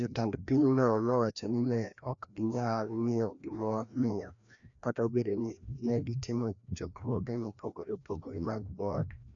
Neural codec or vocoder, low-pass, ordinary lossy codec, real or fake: codec, 16 kHz, 1 kbps, FreqCodec, larger model; 7.2 kHz; none; fake